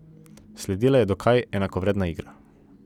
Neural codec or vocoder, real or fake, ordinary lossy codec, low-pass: none; real; none; 19.8 kHz